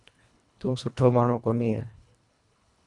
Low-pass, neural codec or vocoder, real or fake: 10.8 kHz; codec, 24 kHz, 1.5 kbps, HILCodec; fake